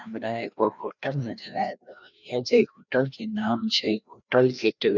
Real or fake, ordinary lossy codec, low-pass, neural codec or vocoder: fake; none; 7.2 kHz; codec, 16 kHz, 1 kbps, FreqCodec, larger model